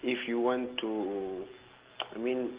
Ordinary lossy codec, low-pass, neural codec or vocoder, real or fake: Opus, 16 kbps; 3.6 kHz; none; real